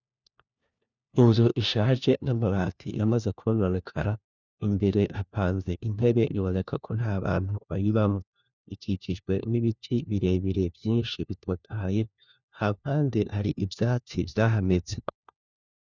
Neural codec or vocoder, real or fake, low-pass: codec, 16 kHz, 1 kbps, FunCodec, trained on LibriTTS, 50 frames a second; fake; 7.2 kHz